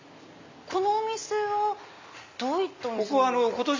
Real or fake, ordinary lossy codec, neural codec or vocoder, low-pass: real; MP3, 48 kbps; none; 7.2 kHz